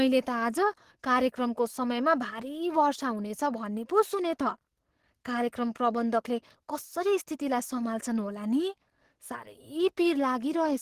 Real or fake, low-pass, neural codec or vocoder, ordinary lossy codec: fake; 14.4 kHz; codec, 44.1 kHz, 7.8 kbps, DAC; Opus, 16 kbps